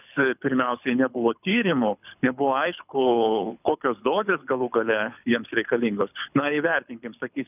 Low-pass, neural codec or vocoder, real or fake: 3.6 kHz; codec, 24 kHz, 6 kbps, HILCodec; fake